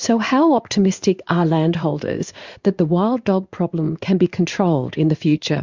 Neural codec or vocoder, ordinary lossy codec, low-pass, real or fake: codec, 16 kHz in and 24 kHz out, 1 kbps, XY-Tokenizer; Opus, 64 kbps; 7.2 kHz; fake